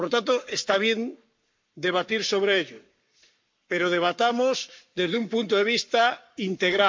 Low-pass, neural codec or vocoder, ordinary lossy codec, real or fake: 7.2 kHz; codec, 44.1 kHz, 7.8 kbps, Pupu-Codec; MP3, 48 kbps; fake